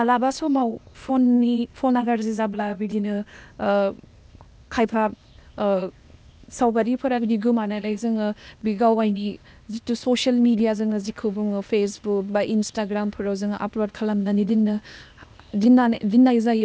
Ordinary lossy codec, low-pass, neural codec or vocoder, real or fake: none; none; codec, 16 kHz, 0.8 kbps, ZipCodec; fake